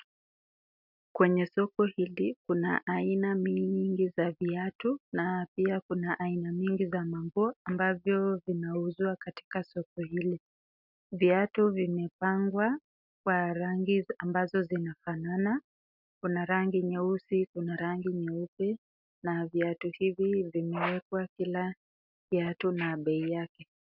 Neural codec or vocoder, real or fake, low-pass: none; real; 5.4 kHz